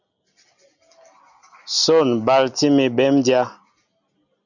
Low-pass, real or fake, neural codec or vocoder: 7.2 kHz; real; none